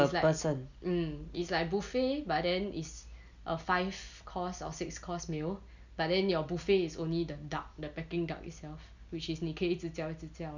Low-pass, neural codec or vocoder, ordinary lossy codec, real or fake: 7.2 kHz; none; none; real